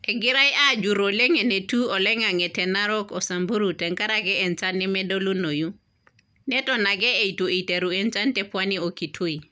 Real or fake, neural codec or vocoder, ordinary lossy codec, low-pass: real; none; none; none